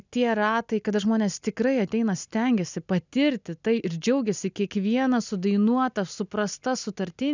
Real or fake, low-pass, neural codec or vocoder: real; 7.2 kHz; none